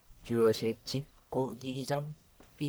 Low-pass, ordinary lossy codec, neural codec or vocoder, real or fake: none; none; codec, 44.1 kHz, 1.7 kbps, Pupu-Codec; fake